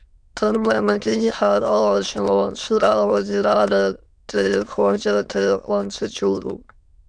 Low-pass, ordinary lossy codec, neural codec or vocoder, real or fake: 9.9 kHz; MP3, 96 kbps; autoencoder, 22.05 kHz, a latent of 192 numbers a frame, VITS, trained on many speakers; fake